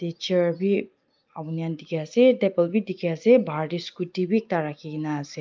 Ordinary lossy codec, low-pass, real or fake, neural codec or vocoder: Opus, 24 kbps; 7.2 kHz; real; none